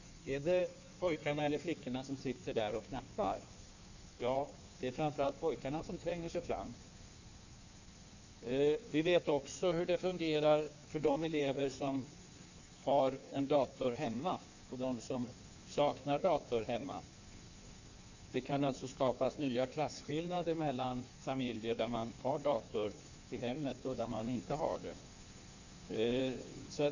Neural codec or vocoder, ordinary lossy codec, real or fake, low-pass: codec, 16 kHz in and 24 kHz out, 1.1 kbps, FireRedTTS-2 codec; none; fake; 7.2 kHz